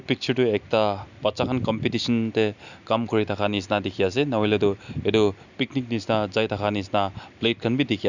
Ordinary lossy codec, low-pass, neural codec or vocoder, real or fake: none; 7.2 kHz; none; real